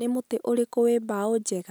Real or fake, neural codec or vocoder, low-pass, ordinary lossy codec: real; none; none; none